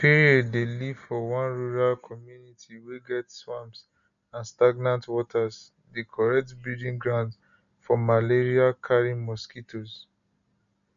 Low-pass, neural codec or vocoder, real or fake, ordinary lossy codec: 7.2 kHz; none; real; none